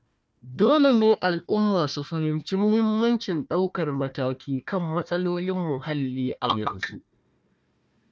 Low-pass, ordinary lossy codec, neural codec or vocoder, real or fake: none; none; codec, 16 kHz, 1 kbps, FunCodec, trained on Chinese and English, 50 frames a second; fake